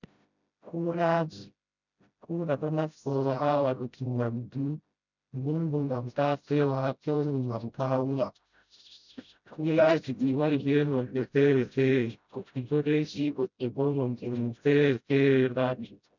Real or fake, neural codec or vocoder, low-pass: fake; codec, 16 kHz, 0.5 kbps, FreqCodec, smaller model; 7.2 kHz